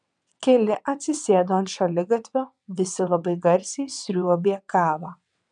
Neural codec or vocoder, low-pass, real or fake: vocoder, 22.05 kHz, 80 mel bands, WaveNeXt; 9.9 kHz; fake